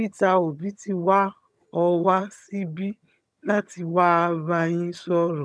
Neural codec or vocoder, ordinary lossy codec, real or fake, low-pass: vocoder, 22.05 kHz, 80 mel bands, HiFi-GAN; none; fake; none